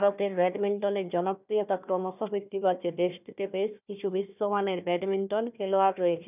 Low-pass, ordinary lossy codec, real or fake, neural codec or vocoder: 3.6 kHz; none; fake; codec, 16 kHz, 1 kbps, FunCodec, trained on Chinese and English, 50 frames a second